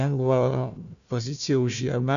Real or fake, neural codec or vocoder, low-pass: fake; codec, 16 kHz, 1 kbps, FunCodec, trained on Chinese and English, 50 frames a second; 7.2 kHz